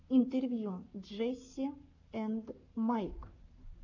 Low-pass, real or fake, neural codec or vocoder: 7.2 kHz; fake; codec, 44.1 kHz, 7.8 kbps, Pupu-Codec